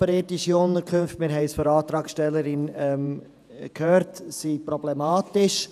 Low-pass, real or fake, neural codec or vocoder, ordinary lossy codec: 14.4 kHz; fake; vocoder, 48 kHz, 128 mel bands, Vocos; none